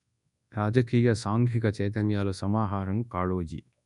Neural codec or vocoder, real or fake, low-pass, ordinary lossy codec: codec, 24 kHz, 0.9 kbps, WavTokenizer, large speech release; fake; 10.8 kHz; none